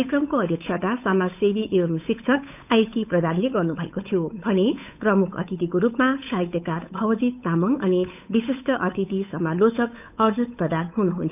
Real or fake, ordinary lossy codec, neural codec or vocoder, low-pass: fake; none; codec, 16 kHz, 8 kbps, FunCodec, trained on Chinese and English, 25 frames a second; 3.6 kHz